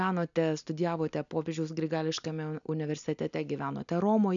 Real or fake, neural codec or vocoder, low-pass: real; none; 7.2 kHz